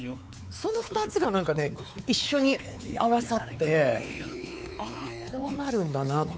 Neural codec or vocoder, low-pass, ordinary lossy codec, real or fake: codec, 16 kHz, 4 kbps, X-Codec, WavLM features, trained on Multilingual LibriSpeech; none; none; fake